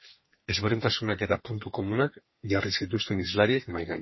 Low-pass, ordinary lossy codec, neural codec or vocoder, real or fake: 7.2 kHz; MP3, 24 kbps; codec, 32 kHz, 1.9 kbps, SNAC; fake